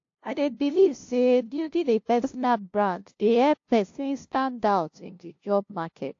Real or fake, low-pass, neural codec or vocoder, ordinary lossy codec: fake; 7.2 kHz; codec, 16 kHz, 0.5 kbps, FunCodec, trained on LibriTTS, 25 frames a second; AAC, 48 kbps